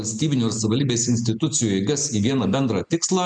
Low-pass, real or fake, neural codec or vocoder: 9.9 kHz; real; none